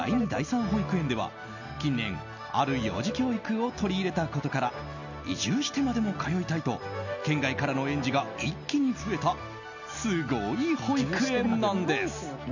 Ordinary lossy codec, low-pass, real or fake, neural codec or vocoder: none; 7.2 kHz; real; none